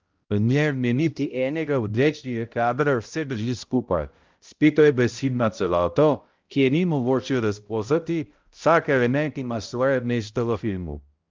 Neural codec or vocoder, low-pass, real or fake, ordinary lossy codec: codec, 16 kHz, 0.5 kbps, X-Codec, HuBERT features, trained on balanced general audio; 7.2 kHz; fake; Opus, 24 kbps